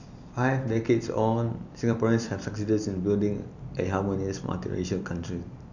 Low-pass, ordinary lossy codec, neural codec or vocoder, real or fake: 7.2 kHz; none; vocoder, 44.1 kHz, 128 mel bands every 256 samples, BigVGAN v2; fake